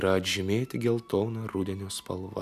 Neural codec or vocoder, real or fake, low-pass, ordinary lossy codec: none; real; 14.4 kHz; AAC, 96 kbps